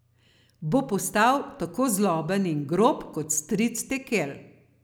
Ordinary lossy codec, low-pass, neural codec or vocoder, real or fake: none; none; none; real